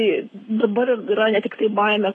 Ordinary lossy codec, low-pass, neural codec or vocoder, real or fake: AAC, 32 kbps; 10.8 kHz; codec, 44.1 kHz, 7.8 kbps, Pupu-Codec; fake